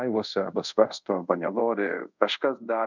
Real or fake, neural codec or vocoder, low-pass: fake; codec, 24 kHz, 0.5 kbps, DualCodec; 7.2 kHz